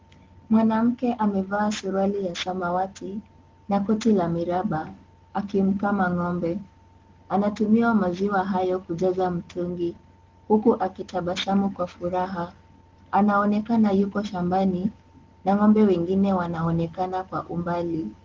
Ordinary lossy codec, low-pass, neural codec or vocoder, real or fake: Opus, 16 kbps; 7.2 kHz; none; real